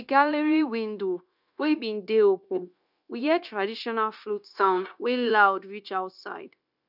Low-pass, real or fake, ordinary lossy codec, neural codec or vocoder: 5.4 kHz; fake; none; codec, 16 kHz, 0.9 kbps, LongCat-Audio-Codec